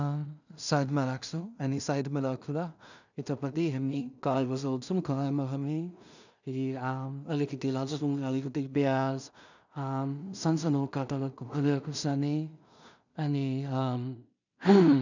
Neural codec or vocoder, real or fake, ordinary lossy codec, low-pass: codec, 16 kHz in and 24 kHz out, 0.4 kbps, LongCat-Audio-Codec, two codebook decoder; fake; none; 7.2 kHz